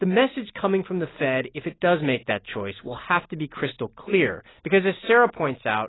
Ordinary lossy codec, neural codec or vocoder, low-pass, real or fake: AAC, 16 kbps; none; 7.2 kHz; real